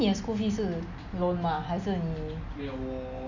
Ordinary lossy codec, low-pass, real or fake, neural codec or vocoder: none; 7.2 kHz; real; none